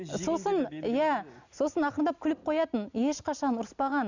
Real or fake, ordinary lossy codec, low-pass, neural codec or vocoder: real; none; 7.2 kHz; none